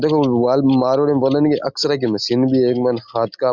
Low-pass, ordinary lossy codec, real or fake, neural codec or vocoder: 7.2 kHz; Opus, 64 kbps; real; none